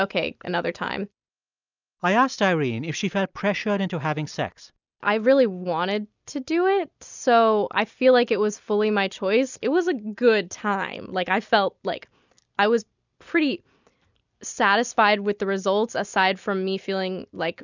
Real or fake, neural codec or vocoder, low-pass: real; none; 7.2 kHz